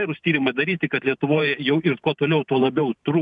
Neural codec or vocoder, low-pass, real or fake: vocoder, 44.1 kHz, 128 mel bands every 512 samples, BigVGAN v2; 10.8 kHz; fake